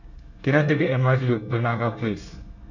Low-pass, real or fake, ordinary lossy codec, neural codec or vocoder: 7.2 kHz; fake; none; codec, 24 kHz, 1 kbps, SNAC